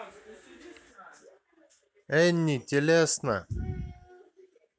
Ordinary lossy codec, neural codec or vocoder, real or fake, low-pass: none; none; real; none